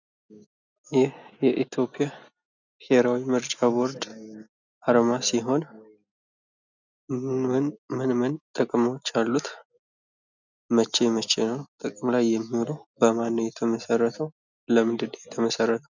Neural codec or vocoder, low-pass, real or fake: none; 7.2 kHz; real